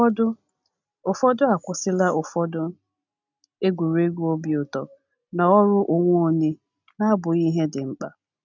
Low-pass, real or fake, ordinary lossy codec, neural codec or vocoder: 7.2 kHz; real; none; none